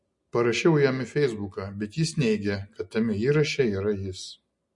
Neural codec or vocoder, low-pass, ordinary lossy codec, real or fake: none; 10.8 kHz; MP3, 48 kbps; real